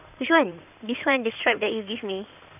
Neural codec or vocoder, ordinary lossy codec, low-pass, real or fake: codec, 16 kHz in and 24 kHz out, 2.2 kbps, FireRedTTS-2 codec; none; 3.6 kHz; fake